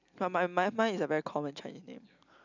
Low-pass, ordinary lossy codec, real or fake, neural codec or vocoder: 7.2 kHz; none; real; none